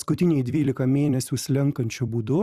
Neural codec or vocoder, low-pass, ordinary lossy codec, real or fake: vocoder, 44.1 kHz, 128 mel bands every 256 samples, BigVGAN v2; 14.4 kHz; Opus, 64 kbps; fake